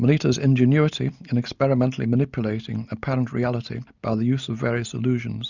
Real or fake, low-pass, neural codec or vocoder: real; 7.2 kHz; none